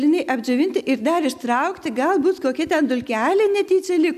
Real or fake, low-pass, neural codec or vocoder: real; 14.4 kHz; none